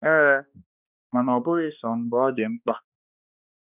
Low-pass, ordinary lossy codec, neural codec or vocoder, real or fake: 3.6 kHz; none; codec, 16 kHz, 2 kbps, X-Codec, HuBERT features, trained on balanced general audio; fake